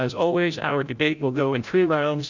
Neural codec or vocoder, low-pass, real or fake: codec, 16 kHz, 0.5 kbps, FreqCodec, larger model; 7.2 kHz; fake